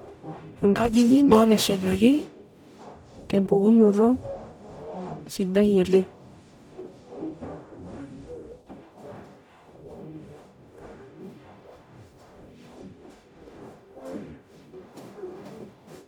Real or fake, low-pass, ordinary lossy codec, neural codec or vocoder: fake; 19.8 kHz; none; codec, 44.1 kHz, 0.9 kbps, DAC